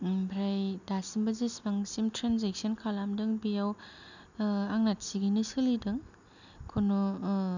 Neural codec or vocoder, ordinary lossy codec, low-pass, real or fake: none; none; 7.2 kHz; real